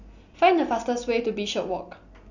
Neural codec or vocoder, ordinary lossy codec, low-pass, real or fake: none; none; 7.2 kHz; real